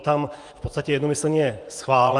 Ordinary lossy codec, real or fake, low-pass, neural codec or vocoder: Opus, 32 kbps; fake; 10.8 kHz; vocoder, 24 kHz, 100 mel bands, Vocos